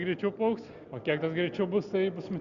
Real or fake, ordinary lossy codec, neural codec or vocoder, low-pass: real; Opus, 64 kbps; none; 7.2 kHz